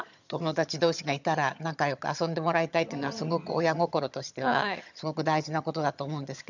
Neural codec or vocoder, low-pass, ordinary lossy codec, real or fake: vocoder, 22.05 kHz, 80 mel bands, HiFi-GAN; 7.2 kHz; none; fake